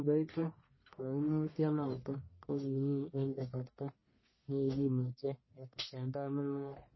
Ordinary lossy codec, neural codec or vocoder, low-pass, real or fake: MP3, 24 kbps; codec, 44.1 kHz, 1.7 kbps, Pupu-Codec; 7.2 kHz; fake